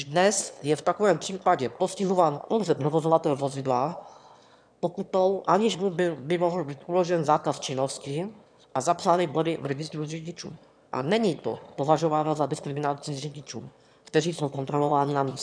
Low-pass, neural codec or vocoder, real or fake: 9.9 kHz; autoencoder, 22.05 kHz, a latent of 192 numbers a frame, VITS, trained on one speaker; fake